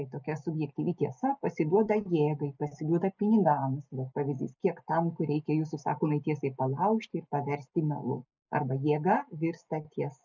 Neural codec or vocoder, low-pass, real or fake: none; 7.2 kHz; real